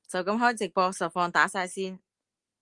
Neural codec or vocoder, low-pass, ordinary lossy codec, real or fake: none; 10.8 kHz; Opus, 24 kbps; real